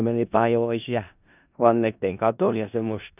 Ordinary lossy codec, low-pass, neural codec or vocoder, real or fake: none; 3.6 kHz; codec, 16 kHz in and 24 kHz out, 0.4 kbps, LongCat-Audio-Codec, four codebook decoder; fake